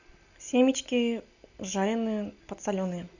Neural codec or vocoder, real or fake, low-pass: none; real; 7.2 kHz